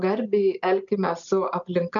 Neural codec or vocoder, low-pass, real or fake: none; 7.2 kHz; real